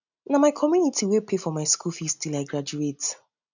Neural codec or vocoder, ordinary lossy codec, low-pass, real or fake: none; none; 7.2 kHz; real